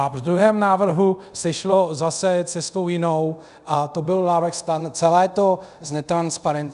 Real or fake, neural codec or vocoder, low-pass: fake; codec, 24 kHz, 0.5 kbps, DualCodec; 10.8 kHz